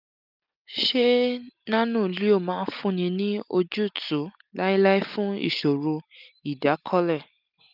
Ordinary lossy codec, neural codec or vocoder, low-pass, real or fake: none; none; 5.4 kHz; real